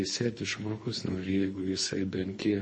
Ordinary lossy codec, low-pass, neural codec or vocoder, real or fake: MP3, 32 kbps; 9.9 kHz; codec, 24 kHz, 3 kbps, HILCodec; fake